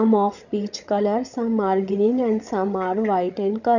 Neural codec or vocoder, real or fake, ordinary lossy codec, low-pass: codec, 16 kHz, 16 kbps, FreqCodec, larger model; fake; none; 7.2 kHz